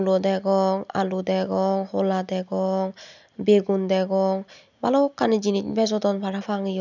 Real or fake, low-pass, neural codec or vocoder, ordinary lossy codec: real; 7.2 kHz; none; none